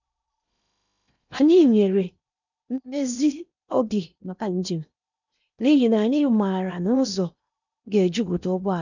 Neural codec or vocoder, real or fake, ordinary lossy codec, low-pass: codec, 16 kHz in and 24 kHz out, 0.8 kbps, FocalCodec, streaming, 65536 codes; fake; none; 7.2 kHz